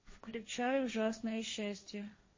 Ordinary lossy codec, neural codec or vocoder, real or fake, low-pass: MP3, 32 kbps; codec, 16 kHz, 1.1 kbps, Voila-Tokenizer; fake; 7.2 kHz